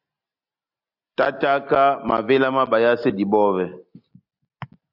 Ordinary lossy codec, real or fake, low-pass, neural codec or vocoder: AAC, 48 kbps; real; 5.4 kHz; none